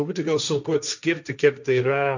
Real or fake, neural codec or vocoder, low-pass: fake; codec, 16 kHz, 1.1 kbps, Voila-Tokenizer; 7.2 kHz